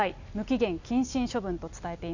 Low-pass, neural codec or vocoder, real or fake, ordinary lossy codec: 7.2 kHz; none; real; none